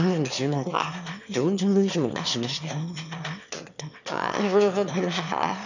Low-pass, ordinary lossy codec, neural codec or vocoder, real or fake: 7.2 kHz; none; autoencoder, 22.05 kHz, a latent of 192 numbers a frame, VITS, trained on one speaker; fake